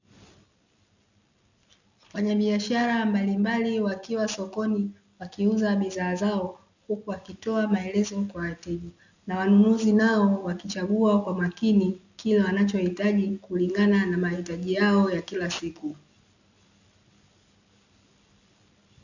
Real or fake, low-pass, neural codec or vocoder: real; 7.2 kHz; none